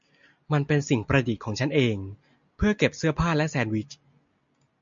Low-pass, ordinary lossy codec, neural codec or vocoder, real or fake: 7.2 kHz; MP3, 64 kbps; none; real